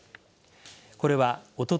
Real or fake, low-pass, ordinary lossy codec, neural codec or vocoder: real; none; none; none